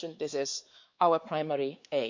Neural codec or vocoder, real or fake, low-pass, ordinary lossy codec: codec, 16 kHz, 4 kbps, X-Codec, WavLM features, trained on Multilingual LibriSpeech; fake; 7.2 kHz; MP3, 64 kbps